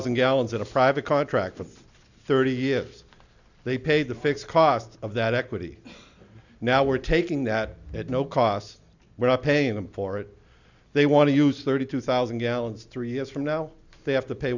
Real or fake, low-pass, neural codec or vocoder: real; 7.2 kHz; none